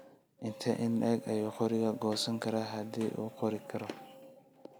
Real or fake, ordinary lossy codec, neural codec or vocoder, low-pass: real; none; none; none